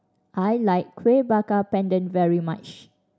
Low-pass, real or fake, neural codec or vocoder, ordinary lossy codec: none; real; none; none